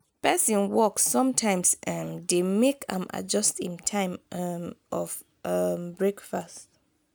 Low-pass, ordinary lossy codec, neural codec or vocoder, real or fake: none; none; none; real